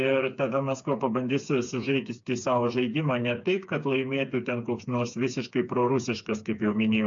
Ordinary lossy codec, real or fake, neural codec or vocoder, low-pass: AAC, 64 kbps; fake; codec, 16 kHz, 4 kbps, FreqCodec, smaller model; 7.2 kHz